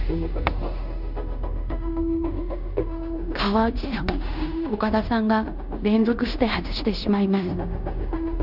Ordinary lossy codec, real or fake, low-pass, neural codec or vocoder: none; fake; 5.4 kHz; codec, 16 kHz in and 24 kHz out, 0.9 kbps, LongCat-Audio-Codec, fine tuned four codebook decoder